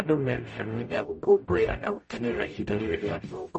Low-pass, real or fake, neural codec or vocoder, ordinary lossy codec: 10.8 kHz; fake; codec, 44.1 kHz, 0.9 kbps, DAC; MP3, 32 kbps